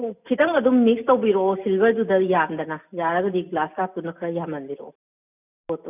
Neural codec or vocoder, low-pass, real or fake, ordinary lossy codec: none; 3.6 kHz; real; none